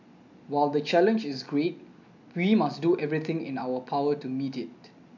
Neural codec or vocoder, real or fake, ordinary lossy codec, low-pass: none; real; none; 7.2 kHz